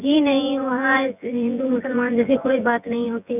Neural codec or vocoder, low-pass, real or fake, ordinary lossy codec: vocoder, 24 kHz, 100 mel bands, Vocos; 3.6 kHz; fake; none